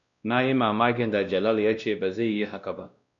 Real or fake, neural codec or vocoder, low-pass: fake; codec, 16 kHz, 1 kbps, X-Codec, WavLM features, trained on Multilingual LibriSpeech; 7.2 kHz